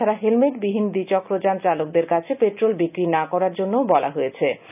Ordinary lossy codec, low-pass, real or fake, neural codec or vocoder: none; 3.6 kHz; real; none